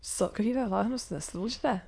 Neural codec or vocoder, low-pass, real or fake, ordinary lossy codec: autoencoder, 22.05 kHz, a latent of 192 numbers a frame, VITS, trained on many speakers; none; fake; none